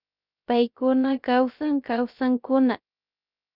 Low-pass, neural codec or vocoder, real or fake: 5.4 kHz; codec, 16 kHz, 0.3 kbps, FocalCodec; fake